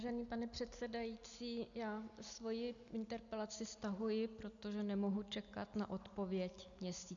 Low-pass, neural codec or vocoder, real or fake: 7.2 kHz; none; real